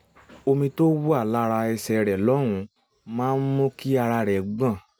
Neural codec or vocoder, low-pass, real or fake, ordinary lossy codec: none; none; real; none